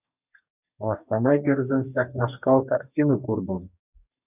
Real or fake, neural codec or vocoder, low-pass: fake; codec, 44.1 kHz, 2.6 kbps, DAC; 3.6 kHz